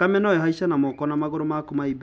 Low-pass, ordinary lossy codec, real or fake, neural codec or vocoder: none; none; real; none